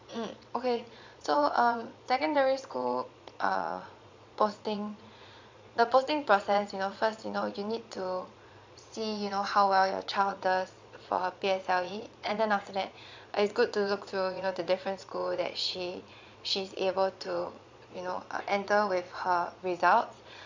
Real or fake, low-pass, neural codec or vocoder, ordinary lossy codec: fake; 7.2 kHz; vocoder, 22.05 kHz, 80 mel bands, Vocos; MP3, 64 kbps